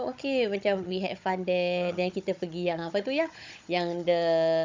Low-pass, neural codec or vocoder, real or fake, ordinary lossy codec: 7.2 kHz; codec, 16 kHz, 16 kbps, FunCodec, trained on Chinese and English, 50 frames a second; fake; MP3, 64 kbps